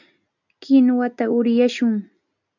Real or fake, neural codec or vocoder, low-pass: real; none; 7.2 kHz